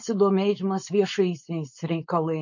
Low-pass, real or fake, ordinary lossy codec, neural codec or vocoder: 7.2 kHz; fake; MP3, 48 kbps; codec, 16 kHz, 4.8 kbps, FACodec